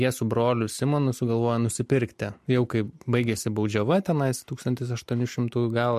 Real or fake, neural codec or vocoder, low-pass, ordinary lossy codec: real; none; 14.4 kHz; MP3, 64 kbps